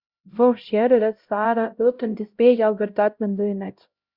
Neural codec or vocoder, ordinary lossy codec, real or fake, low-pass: codec, 16 kHz, 0.5 kbps, X-Codec, HuBERT features, trained on LibriSpeech; Opus, 64 kbps; fake; 5.4 kHz